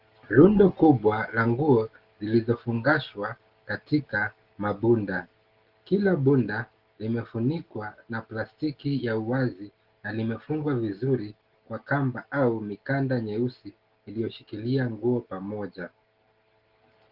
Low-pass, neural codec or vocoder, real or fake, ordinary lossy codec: 5.4 kHz; none; real; Opus, 16 kbps